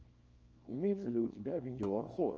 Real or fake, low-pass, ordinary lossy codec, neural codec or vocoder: fake; 7.2 kHz; Opus, 24 kbps; codec, 24 kHz, 0.9 kbps, WavTokenizer, small release